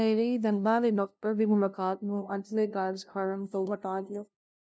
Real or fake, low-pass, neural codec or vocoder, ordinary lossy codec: fake; none; codec, 16 kHz, 0.5 kbps, FunCodec, trained on LibriTTS, 25 frames a second; none